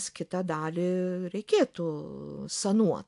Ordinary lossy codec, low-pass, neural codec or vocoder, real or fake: AAC, 64 kbps; 10.8 kHz; none; real